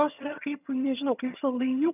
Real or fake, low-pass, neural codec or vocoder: fake; 3.6 kHz; vocoder, 22.05 kHz, 80 mel bands, HiFi-GAN